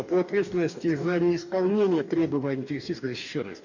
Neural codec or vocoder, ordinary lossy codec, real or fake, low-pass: codec, 44.1 kHz, 2.6 kbps, DAC; none; fake; 7.2 kHz